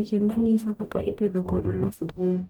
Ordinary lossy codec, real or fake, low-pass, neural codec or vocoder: none; fake; 19.8 kHz; codec, 44.1 kHz, 0.9 kbps, DAC